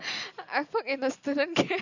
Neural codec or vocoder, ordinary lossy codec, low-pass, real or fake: none; none; 7.2 kHz; real